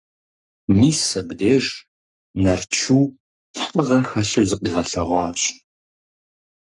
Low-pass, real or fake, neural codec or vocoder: 10.8 kHz; fake; codec, 44.1 kHz, 3.4 kbps, Pupu-Codec